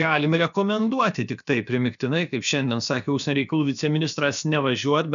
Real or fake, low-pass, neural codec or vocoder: fake; 7.2 kHz; codec, 16 kHz, about 1 kbps, DyCAST, with the encoder's durations